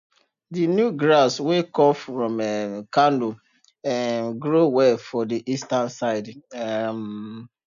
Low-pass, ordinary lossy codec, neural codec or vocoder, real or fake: 7.2 kHz; none; none; real